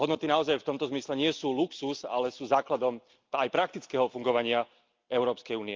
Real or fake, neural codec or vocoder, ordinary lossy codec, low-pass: real; none; Opus, 24 kbps; 7.2 kHz